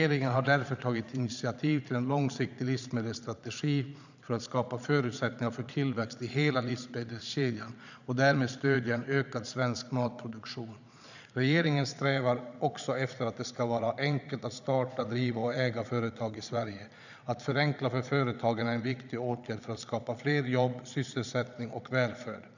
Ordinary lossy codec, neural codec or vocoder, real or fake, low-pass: none; vocoder, 22.05 kHz, 80 mel bands, Vocos; fake; 7.2 kHz